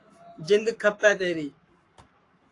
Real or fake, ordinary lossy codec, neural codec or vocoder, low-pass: fake; AAC, 48 kbps; codec, 44.1 kHz, 7.8 kbps, Pupu-Codec; 10.8 kHz